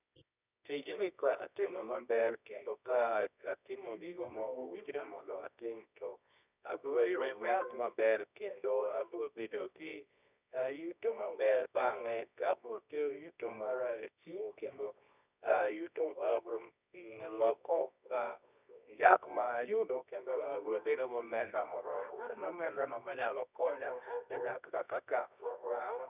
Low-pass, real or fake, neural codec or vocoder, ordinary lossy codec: 3.6 kHz; fake; codec, 24 kHz, 0.9 kbps, WavTokenizer, medium music audio release; none